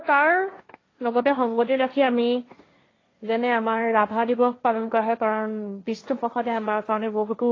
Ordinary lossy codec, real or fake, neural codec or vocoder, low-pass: AAC, 32 kbps; fake; codec, 16 kHz, 1.1 kbps, Voila-Tokenizer; 7.2 kHz